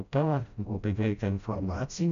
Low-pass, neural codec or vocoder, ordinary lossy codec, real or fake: 7.2 kHz; codec, 16 kHz, 0.5 kbps, FreqCodec, smaller model; AAC, 96 kbps; fake